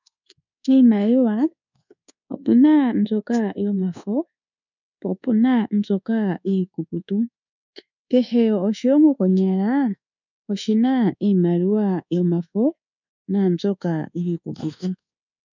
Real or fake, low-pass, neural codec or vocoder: fake; 7.2 kHz; codec, 24 kHz, 1.2 kbps, DualCodec